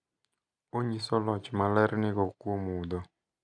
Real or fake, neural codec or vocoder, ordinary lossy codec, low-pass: real; none; none; 9.9 kHz